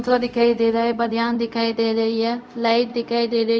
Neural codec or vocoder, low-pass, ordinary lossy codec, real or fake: codec, 16 kHz, 0.4 kbps, LongCat-Audio-Codec; none; none; fake